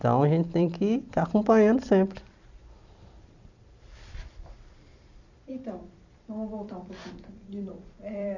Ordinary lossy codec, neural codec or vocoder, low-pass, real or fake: none; vocoder, 44.1 kHz, 128 mel bands every 256 samples, BigVGAN v2; 7.2 kHz; fake